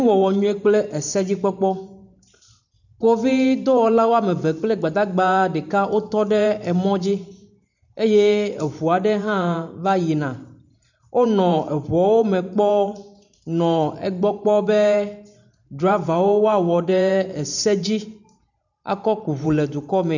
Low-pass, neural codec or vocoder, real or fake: 7.2 kHz; vocoder, 44.1 kHz, 128 mel bands every 512 samples, BigVGAN v2; fake